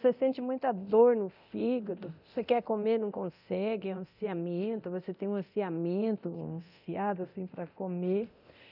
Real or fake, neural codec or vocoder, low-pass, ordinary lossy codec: fake; codec, 24 kHz, 0.9 kbps, DualCodec; 5.4 kHz; none